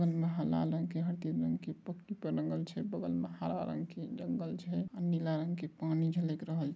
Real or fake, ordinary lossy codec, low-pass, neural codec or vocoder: real; none; none; none